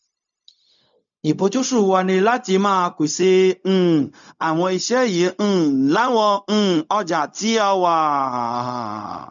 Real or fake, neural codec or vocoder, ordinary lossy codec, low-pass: fake; codec, 16 kHz, 0.4 kbps, LongCat-Audio-Codec; none; 7.2 kHz